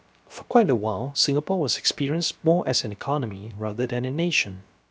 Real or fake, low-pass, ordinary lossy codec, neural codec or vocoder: fake; none; none; codec, 16 kHz, about 1 kbps, DyCAST, with the encoder's durations